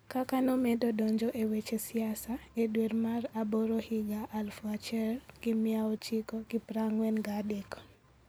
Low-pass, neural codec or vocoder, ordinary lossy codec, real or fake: none; none; none; real